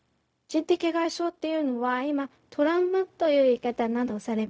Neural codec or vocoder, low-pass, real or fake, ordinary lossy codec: codec, 16 kHz, 0.4 kbps, LongCat-Audio-Codec; none; fake; none